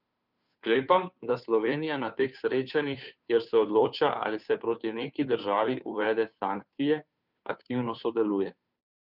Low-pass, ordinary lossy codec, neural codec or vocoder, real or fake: 5.4 kHz; none; codec, 16 kHz, 2 kbps, FunCodec, trained on Chinese and English, 25 frames a second; fake